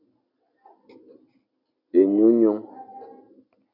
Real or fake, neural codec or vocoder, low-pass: real; none; 5.4 kHz